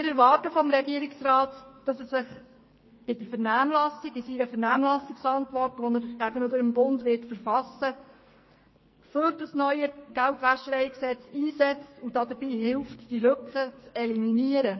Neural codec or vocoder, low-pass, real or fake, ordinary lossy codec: codec, 44.1 kHz, 2.6 kbps, SNAC; 7.2 kHz; fake; MP3, 24 kbps